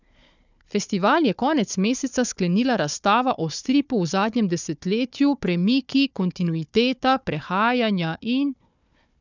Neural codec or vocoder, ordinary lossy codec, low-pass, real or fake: codec, 16 kHz, 4 kbps, FunCodec, trained on Chinese and English, 50 frames a second; none; 7.2 kHz; fake